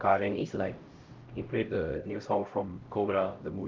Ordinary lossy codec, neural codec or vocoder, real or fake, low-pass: Opus, 24 kbps; codec, 16 kHz, 0.5 kbps, X-Codec, HuBERT features, trained on LibriSpeech; fake; 7.2 kHz